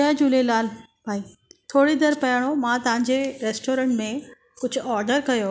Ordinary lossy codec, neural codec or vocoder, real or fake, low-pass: none; none; real; none